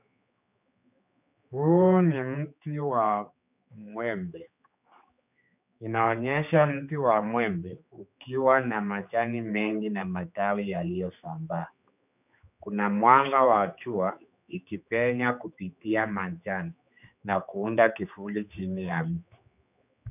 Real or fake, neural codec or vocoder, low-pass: fake; codec, 16 kHz, 4 kbps, X-Codec, HuBERT features, trained on general audio; 3.6 kHz